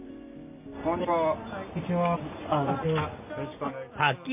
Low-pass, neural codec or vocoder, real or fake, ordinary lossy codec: 3.6 kHz; none; real; MP3, 32 kbps